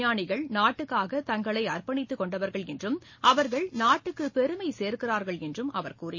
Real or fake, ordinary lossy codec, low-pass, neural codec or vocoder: real; MP3, 32 kbps; 7.2 kHz; none